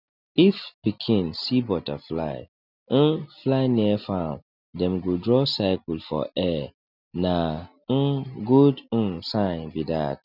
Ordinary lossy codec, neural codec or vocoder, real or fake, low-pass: none; none; real; 5.4 kHz